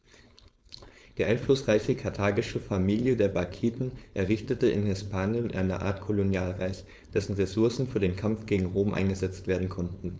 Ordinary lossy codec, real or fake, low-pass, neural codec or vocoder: none; fake; none; codec, 16 kHz, 4.8 kbps, FACodec